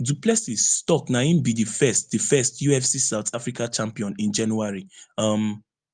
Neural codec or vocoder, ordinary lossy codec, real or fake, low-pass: none; Opus, 32 kbps; real; 9.9 kHz